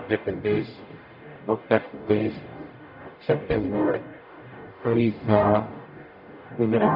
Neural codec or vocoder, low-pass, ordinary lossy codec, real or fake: codec, 44.1 kHz, 0.9 kbps, DAC; 5.4 kHz; none; fake